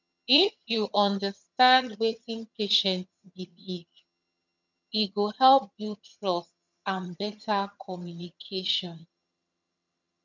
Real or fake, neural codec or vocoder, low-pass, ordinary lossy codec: fake; vocoder, 22.05 kHz, 80 mel bands, HiFi-GAN; 7.2 kHz; none